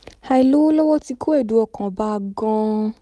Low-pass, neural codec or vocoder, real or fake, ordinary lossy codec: none; none; real; none